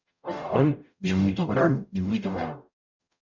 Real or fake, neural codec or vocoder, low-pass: fake; codec, 44.1 kHz, 0.9 kbps, DAC; 7.2 kHz